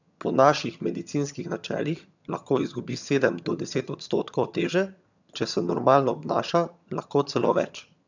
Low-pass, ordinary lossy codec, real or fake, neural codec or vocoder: 7.2 kHz; none; fake; vocoder, 22.05 kHz, 80 mel bands, HiFi-GAN